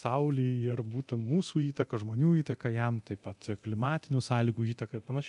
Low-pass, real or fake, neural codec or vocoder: 10.8 kHz; fake; codec, 24 kHz, 0.9 kbps, DualCodec